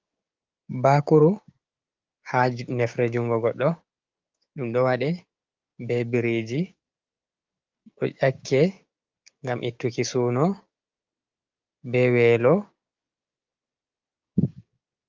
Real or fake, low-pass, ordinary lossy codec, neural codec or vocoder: fake; 7.2 kHz; Opus, 24 kbps; codec, 16 kHz, 6 kbps, DAC